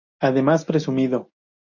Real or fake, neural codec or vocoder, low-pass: real; none; 7.2 kHz